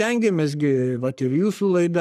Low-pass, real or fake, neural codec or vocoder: 14.4 kHz; fake; codec, 44.1 kHz, 3.4 kbps, Pupu-Codec